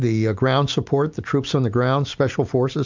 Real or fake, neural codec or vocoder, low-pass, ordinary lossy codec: real; none; 7.2 kHz; MP3, 64 kbps